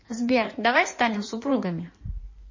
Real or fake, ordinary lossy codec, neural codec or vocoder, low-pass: fake; MP3, 32 kbps; codec, 16 kHz in and 24 kHz out, 1.1 kbps, FireRedTTS-2 codec; 7.2 kHz